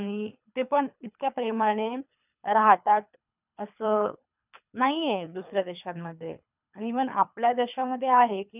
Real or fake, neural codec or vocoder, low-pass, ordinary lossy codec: fake; codec, 24 kHz, 3 kbps, HILCodec; 3.6 kHz; none